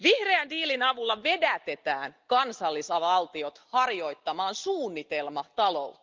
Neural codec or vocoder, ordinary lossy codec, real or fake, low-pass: none; Opus, 24 kbps; real; 7.2 kHz